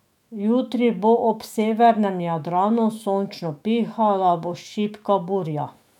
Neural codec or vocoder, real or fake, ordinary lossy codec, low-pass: autoencoder, 48 kHz, 128 numbers a frame, DAC-VAE, trained on Japanese speech; fake; MP3, 96 kbps; 19.8 kHz